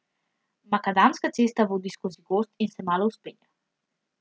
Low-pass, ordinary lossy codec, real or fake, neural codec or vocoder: none; none; real; none